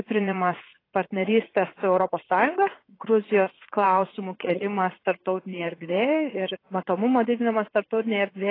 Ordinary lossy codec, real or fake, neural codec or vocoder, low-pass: AAC, 24 kbps; fake; vocoder, 44.1 kHz, 80 mel bands, Vocos; 5.4 kHz